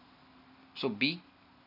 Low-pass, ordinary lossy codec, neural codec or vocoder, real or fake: 5.4 kHz; none; none; real